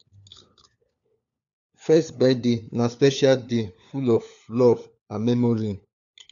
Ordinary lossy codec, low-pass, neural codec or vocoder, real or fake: none; 7.2 kHz; codec, 16 kHz, 4 kbps, FunCodec, trained on LibriTTS, 50 frames a second; fake